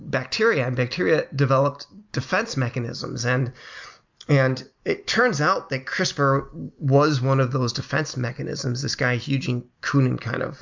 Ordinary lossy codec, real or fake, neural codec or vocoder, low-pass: MP3, 64 kbps; real; none; 7.2 kHz